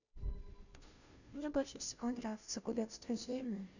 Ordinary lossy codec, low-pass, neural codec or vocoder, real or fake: none; 7.2 kHz; codec, 16 kHz, 0.5 kbps, FunCodec, trained on Chinese and English, 25 frames a second; fake